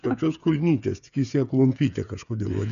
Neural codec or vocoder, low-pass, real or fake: codec, 16 kHz, 8 kbps, FreqCodec, smaller model; 7.2 kHz; fake